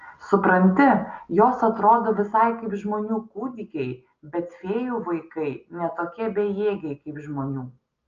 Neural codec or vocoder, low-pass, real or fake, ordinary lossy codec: none; 7.2 kHz; real; Opus, 24 kbps